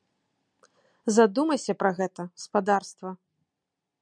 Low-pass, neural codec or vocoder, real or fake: 9.9 kHz; none; real